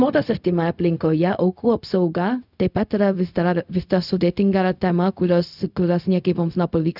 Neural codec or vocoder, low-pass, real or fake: codec, 16 kHz, 0.4 kbps, LongCat-Audio-Codec; 5.4 kHz; fake